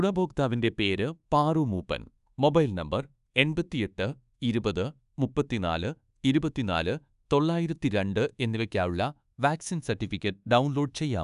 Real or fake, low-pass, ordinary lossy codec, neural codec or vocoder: fake; 10.8 kHz; none; codec, 24 kHz, 1.2 kbps, DualCodec